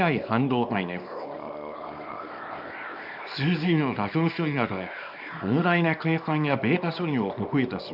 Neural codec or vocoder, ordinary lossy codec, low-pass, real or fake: codec, 24 kHz, 0.9 kbps, WavTokenizer, small release; none; 5.4 kHz; fake